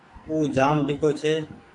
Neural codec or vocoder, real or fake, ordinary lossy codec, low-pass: codec, 44.1 kHz, 2.6 kbps, SNAC; fake; AAC, 64 kbps; 10.8 kHz